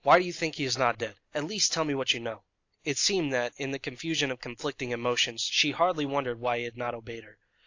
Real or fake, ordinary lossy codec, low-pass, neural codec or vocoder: real; AAC, 48 kbps; 7.2 kHz; none